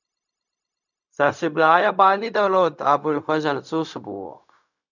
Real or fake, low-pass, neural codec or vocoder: fake; 7.2 kHz; codec, 16 kHz, 0.4 kbps, LongCat-Audio-Codec